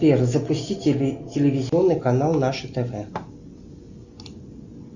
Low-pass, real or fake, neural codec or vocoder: 7.2 kHz; real; none